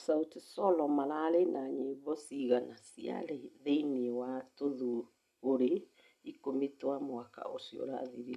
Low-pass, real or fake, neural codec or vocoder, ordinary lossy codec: 14.4 kHz; real; none; none